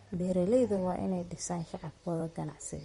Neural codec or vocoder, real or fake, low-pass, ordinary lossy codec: vocoder, 44.1 kHz, 128 mel bands, Pupu-Vocoder; fake; 19.8 kHz; MP3, 48 kbps